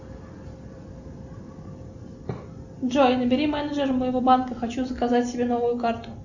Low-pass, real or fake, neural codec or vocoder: 7.2 kHz; real; none